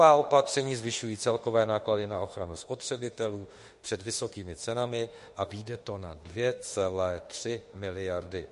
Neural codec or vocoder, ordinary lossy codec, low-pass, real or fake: autoencoder, 48 kHz, 32 numbers a frame, DAC-VAE, trained on Japanese speech; MP3, 48 kbps; 14.4 kHz; fake